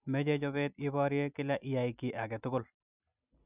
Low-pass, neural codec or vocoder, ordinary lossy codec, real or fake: 3.6 kHz; vocoder, 44.1 kHz, 128 mel bands every 256 samples, BigVGAN v2; none; fake